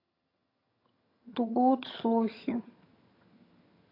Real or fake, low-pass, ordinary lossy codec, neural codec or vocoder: fake; 5.4 kHz; AAC, 24 kbps; vocoder, 22.05 kHz, 80 mel bands, HiFi-GAN